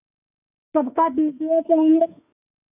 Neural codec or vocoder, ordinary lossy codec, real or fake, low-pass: autoencoder, 48 kHz, 32 numbers a frame, DAC-VAE, trained on Japanese speech; none; fake; 3.6 kHz